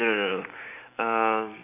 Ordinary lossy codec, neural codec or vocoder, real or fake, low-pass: none; none; real; 3.6 kHz